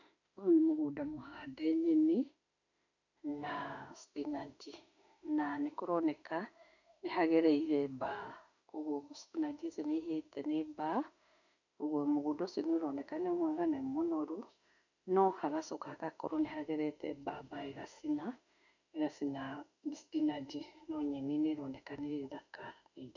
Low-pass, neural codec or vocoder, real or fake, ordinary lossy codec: 7.2 kHz; autoencoder, 48 kHz, 32 numbers a frame, DAC-VAE, trained on Japanese speech; fake; none